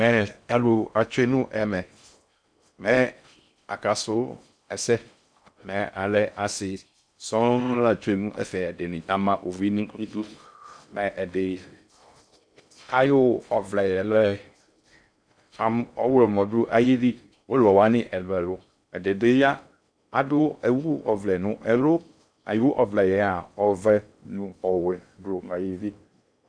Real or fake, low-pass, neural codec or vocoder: fake; 9.9 kHz; codec, 16 kHz in and 24 kHz out, 0.6 kbps, FocalCodec, streaming, 2048 codes